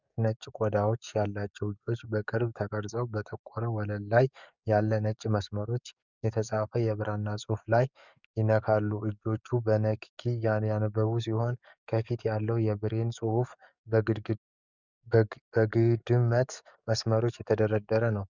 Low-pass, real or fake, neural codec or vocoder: 7.2 kHz; fake; codec, 44.1 kHz, 7.8 kbps, DAC